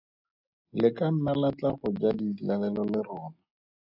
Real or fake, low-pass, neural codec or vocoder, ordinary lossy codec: real; 5.4 kHz; none; AAC, 48 kbps